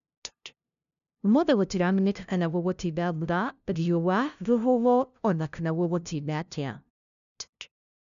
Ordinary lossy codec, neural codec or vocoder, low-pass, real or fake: none; codec, 16 kHz, 0.5 kbps, FunCodec, trained on LibriTTS, 25 frames a second; 7.2 kHz; fake